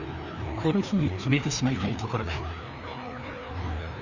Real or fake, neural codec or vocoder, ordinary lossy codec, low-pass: fake; codec, 16 kHz, 2 kbps, FreqCodec, larger model; none; 7.2 kHz